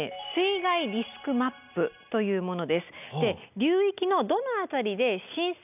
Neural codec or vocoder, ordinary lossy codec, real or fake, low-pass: none; none; real; 3.6 kHz